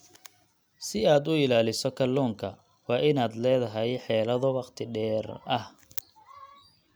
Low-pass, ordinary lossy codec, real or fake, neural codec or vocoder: none; none; real; none